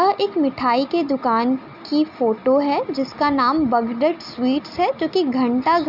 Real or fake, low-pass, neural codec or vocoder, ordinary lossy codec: real; 5.4 kHz; none; none